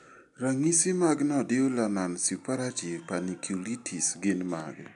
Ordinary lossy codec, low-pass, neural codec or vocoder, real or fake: none; 10.8 kHz; none; real